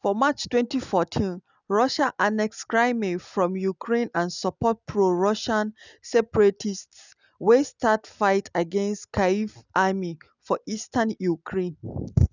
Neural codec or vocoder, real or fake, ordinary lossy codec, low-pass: none; real; none; 7.2 kHz